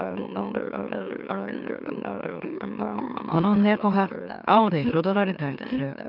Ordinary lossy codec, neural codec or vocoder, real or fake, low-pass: none; autoencoder, 44.1 kHz, a latent of 192 numbers a frame, MeloTTS; fake; 5.4 kHz